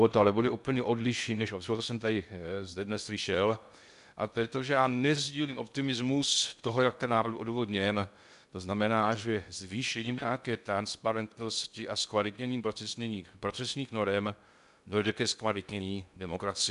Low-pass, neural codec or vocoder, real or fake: 10.8 kHz; codec, 16 kHz in and 24 kHz out, 0.6 kbps, FocalCodec, streaming, 2048 codes; fake